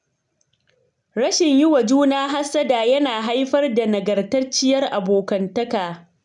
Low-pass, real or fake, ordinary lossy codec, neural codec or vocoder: 10.8 kHz; real; none; none